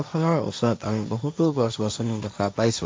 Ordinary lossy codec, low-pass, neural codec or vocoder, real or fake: none; none; codec, 16 kHz, 1.1 kbps, Voila-Tokenizer; fake